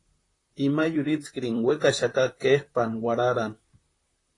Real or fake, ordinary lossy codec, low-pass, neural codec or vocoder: fake; AAC, 32 kbps; 10.8 kHz; vocoder, 44.1 kHz, 128 mel bands, Pupu-Vocoder